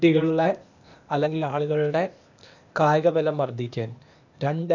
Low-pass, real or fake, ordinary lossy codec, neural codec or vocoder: 7.2 kHz; fake; none; codec, 16 kHz, 0.8 kbps, ZipCodec